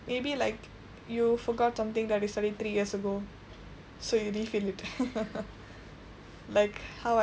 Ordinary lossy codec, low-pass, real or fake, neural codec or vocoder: none; none; real; none